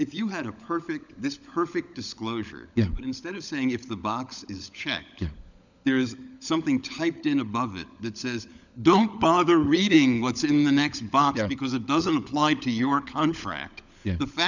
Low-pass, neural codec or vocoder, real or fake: 7.2 kHz; codec, 16 kHz, 16 kbps, FunCodec, trained on LibriTTS, 50 frames a second; fake